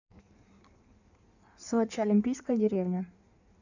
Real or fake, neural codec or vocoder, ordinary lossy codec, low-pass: fake; codec, 16 kHz in and 24 kHz out, 1.1 kbps, FireRedTTS-2 codec; none; 7.2 kHz